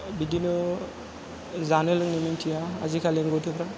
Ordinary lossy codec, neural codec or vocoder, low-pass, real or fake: none; none; none; real